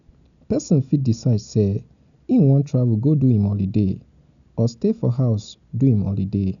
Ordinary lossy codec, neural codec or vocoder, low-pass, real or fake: none; none; 7.2 kHz; real